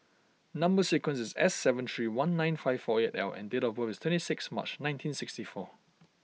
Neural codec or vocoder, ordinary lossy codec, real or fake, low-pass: none; none; real; none